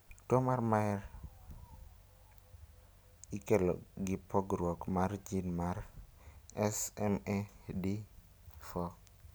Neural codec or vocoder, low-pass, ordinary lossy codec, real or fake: none; none; none; real